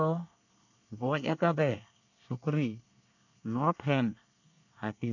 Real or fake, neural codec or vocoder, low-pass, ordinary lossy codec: fake; codec, 24 kHz, 1 kbps, SNAC; 7.2 kHz; none